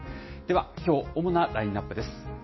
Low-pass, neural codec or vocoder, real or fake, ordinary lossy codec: 7.2 kHz; none; real; MP3, 24 kbps